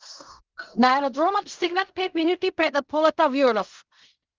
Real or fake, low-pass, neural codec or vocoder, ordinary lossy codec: fake; 7.2 kHz; codec, 16 kHz in and 24 kHz out, 0.4 kbps, LongCat-Audio-Codec, fine tuned four codebook decoder; Opus, 24 kbps